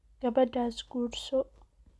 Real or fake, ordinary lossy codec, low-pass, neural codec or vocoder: real; none; none; none